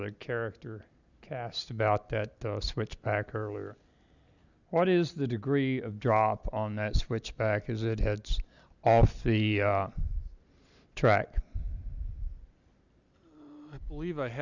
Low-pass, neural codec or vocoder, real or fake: 7.2 kHz; none; real